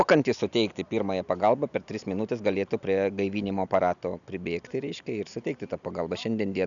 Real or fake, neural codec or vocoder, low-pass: real; none; 7.2 kHz